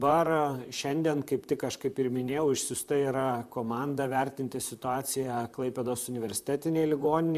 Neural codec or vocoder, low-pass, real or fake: vocoder, 44.1 kHz, 128 mel bands, Pupu-Vocoder; 14.4 kHz; fake